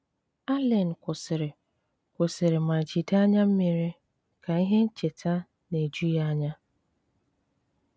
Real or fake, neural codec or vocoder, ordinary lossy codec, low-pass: real; none; none; none